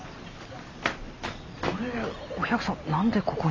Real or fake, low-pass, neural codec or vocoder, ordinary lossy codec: real; 7.2 kHz; none; AAC, 32 kbps